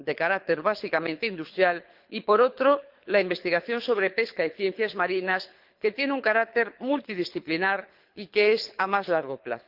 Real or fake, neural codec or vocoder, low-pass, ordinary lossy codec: fake; codec, 24 kHz, 6 kbps, HILCodec; 5.4 kHz; Opus, 32 kbps